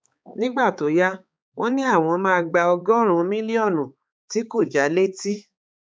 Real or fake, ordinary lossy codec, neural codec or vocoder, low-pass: fake; none; codec, 16 kHz, 4 kbps, X-Codec, HuBERT features, trained on balanced general audio; none